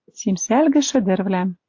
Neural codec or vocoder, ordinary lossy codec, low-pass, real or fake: none; AAC, 48 kbps; 7.2 kHz; real